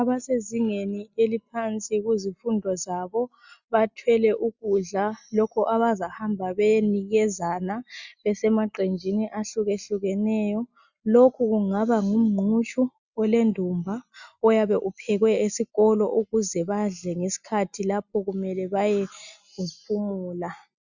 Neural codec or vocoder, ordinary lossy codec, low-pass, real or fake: none; Opus, 64 kbps; 7.2 kHz; real